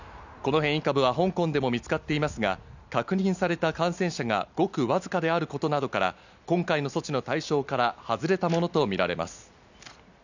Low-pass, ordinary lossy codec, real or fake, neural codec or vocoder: 7.2 kHz; none; real; none